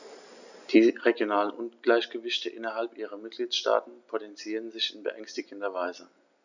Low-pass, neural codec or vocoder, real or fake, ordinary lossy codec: 7.2 kHz; none; real; none